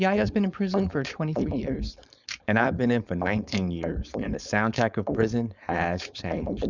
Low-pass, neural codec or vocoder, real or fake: 7.2 kHz; codec, 16 kHz, 4.8 kbps, FACodec; fake